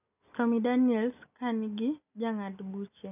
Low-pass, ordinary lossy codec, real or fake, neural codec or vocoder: 3.6 kHz; none; real; none